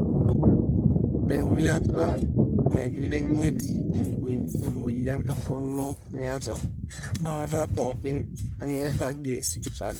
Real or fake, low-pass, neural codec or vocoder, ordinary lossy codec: fake; none; codec, 44.1 kHz, 1.7 kbps, Pupu-Codec; none